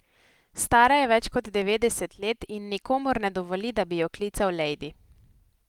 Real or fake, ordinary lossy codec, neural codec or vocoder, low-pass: real; Opus, 24 kbps; none; 19.8 kHz